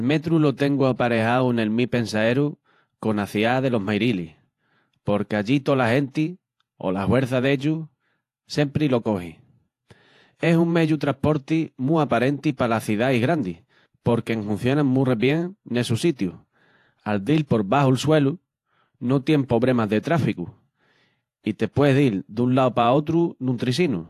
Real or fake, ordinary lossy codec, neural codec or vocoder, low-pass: fake; AAC, 64 kbps; vocoder, 48 kHz, 128 mel bands, Vocos; 14.4 kHz